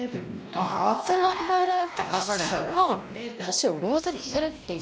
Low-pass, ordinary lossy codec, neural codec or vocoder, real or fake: none; none; codec, 16 kHz, 1 kbps, X-Codec, WavLM features, trained on Multilingual LibriSpeech; fake